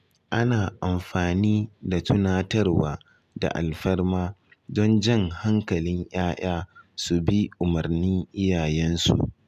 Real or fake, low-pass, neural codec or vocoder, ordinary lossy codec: real; 14.4 kHz; none; none